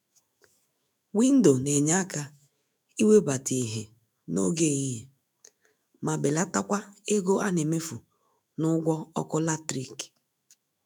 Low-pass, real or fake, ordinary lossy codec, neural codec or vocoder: none; fake; none; autoencoder, 48 kHz, 128 numbers a frame, DAC-VAE, trained on Japanese speech